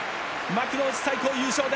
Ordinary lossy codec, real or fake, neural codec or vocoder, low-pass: none; real; none; none